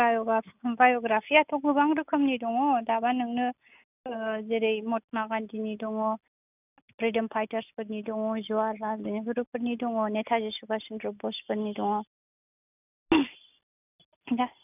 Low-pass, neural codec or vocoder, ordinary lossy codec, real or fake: 3.6 kHz; none; none; real